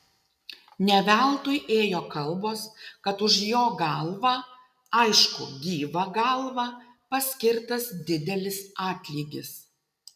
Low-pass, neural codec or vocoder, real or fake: 14.4 kHz; none; real